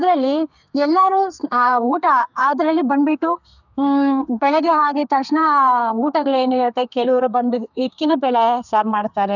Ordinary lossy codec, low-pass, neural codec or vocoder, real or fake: none; 7.2 kHz; codec, 32 kHz, 1.9 kbps, SNAC; fake